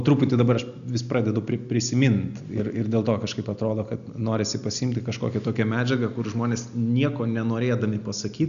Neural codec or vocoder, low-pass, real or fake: none; 7.2 kHz; real